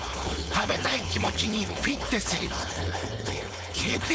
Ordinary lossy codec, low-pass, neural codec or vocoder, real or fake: none; none; codec, 16 kHz, 4.8 kbps, FACodec; fake